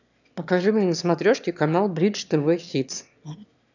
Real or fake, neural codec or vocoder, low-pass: fake; autoencoder, 22.05 kHz, a latent of 192 numbers a frame, VITS, trained on one speaker; 7.2 kHz